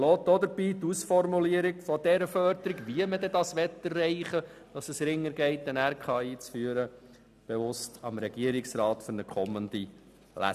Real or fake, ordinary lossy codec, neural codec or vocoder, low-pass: real; none; none; 14.4 kHz